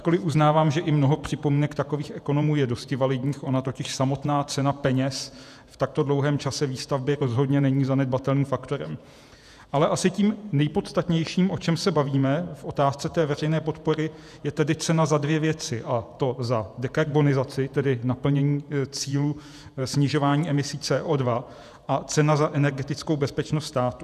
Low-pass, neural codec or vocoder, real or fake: 14.4 kHz; vocoder, 48 kHz, 128 mel bands, Vocos; fake